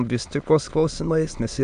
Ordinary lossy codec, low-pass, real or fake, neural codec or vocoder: MP3, 64 kbps; 9.9 kHz; fake; autoencoder, 22.05 kHz, a latent of 192 numbers a frame, VITS, trained on many speakers